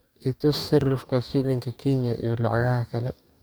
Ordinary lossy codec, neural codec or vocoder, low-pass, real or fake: none; codec, 44.1 kHz, 2.6 kbps, DAC; none; fake